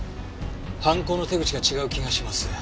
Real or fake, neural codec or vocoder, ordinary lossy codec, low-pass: real; none; none; none